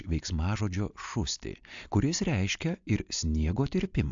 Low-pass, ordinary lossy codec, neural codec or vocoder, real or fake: 7.2 kHz; AAC, 64 kbps; none; real